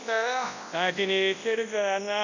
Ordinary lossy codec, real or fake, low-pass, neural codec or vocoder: none; fake; 7.2 kHz; codec, 24 kHz, 0.9 kbps, WavTokenizer, large speech release